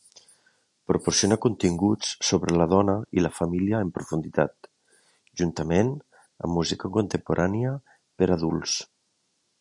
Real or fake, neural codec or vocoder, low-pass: real; none; 10.8 kHz